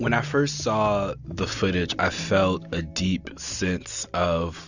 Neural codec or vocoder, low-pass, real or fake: none; 7.2 kHz; real